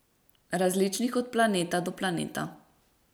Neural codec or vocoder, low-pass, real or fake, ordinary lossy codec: vocoder, 44.1 kHz, 128 mel bands every 256 samples, BigVGAN v2; none; fake; none